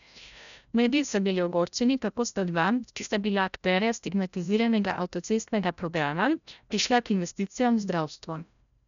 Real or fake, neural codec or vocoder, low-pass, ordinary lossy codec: fake; codec, 16 kHz, 0.5 kbps, FreqCodec, larger model; 7.2 kHz; none